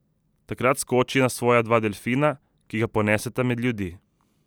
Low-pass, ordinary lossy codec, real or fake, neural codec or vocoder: none; none; real; none